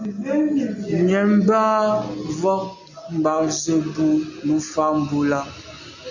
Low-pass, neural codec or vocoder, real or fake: 7.2 kHz; none; real